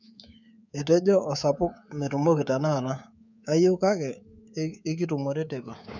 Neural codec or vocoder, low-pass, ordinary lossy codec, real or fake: codec, 24 kHz, 3.1 kbps, DualCodec; 7.2 kHz; none; fake